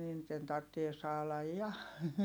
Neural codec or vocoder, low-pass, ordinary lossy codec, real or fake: none; none; none; real